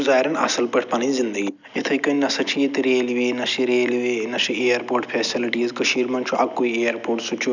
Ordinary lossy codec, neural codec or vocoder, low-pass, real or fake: none; none; 7.2 kHz; real